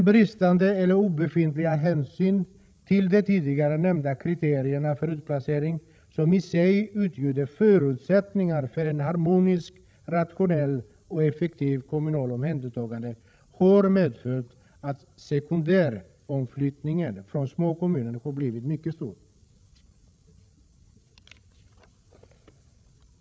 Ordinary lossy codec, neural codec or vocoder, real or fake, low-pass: none; codec, 16 kHz, 16 kbps, FreqCodec, larger model; fake; none